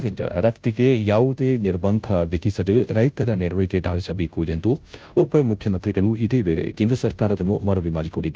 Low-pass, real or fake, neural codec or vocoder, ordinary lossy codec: none; fake; codec, 16 kHz, 0.5 kbps, FunCodec, trained on Chinese and English, 25 frames a second; none